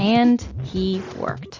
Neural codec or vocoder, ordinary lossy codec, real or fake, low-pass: none; AAC, 48 kbps; real; 7.2 kHz